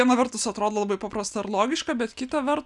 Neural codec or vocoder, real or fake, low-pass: none; real; 10.8 kHz